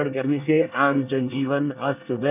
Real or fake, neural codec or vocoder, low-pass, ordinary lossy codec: fake; codec, 24 kHz, 1 kbps, SNAC; 3.6 kHz; none